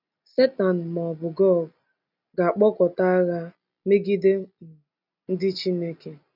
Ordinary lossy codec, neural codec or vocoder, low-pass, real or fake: none; none; 5.4 kHz; real